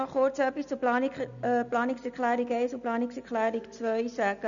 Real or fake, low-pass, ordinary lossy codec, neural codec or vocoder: real; 7.2 kHz; none; none